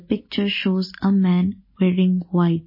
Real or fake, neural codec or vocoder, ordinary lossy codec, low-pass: real; none; MP3, 24 kbps; 5.4 kHz